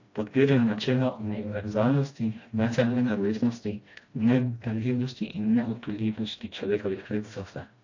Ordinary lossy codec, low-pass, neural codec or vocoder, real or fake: AAC, 48 kbps; 7.2 kHz; codec, 16 kHz, 1 kbps, FreqCodec, smaller model; fake